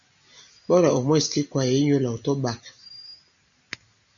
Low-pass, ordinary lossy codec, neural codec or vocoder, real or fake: 7.2 kHz; MP3, 96 kbps; none; real